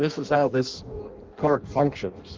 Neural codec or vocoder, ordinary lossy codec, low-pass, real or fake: codec, 16 kHz in and 24 kHz out, 0.6 kbps, FireRedTTS-2 codec; Opus, 32 kbps; 7.2 kHz; fake